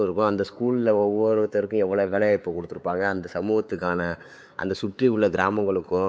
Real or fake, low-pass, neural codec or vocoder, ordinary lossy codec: fake; none; codec, 16 kHz, 4 kbps, X-Codec, WavLM features, trained on Multilingual LibriSpeech; none